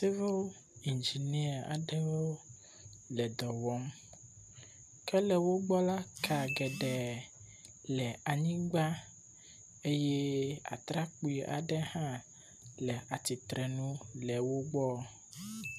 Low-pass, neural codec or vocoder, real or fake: 14.4 kHz; none; real